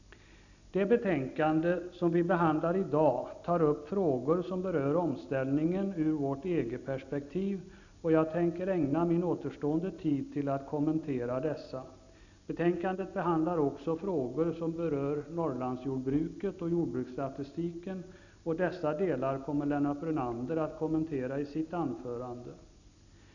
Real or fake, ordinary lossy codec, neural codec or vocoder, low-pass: real; none; none; 7.2 kHz